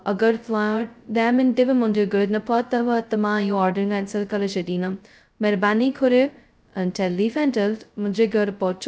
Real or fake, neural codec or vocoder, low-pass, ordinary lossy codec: fake; codec, 16 kHz, 0.2 kbps, FocalCodec; none; none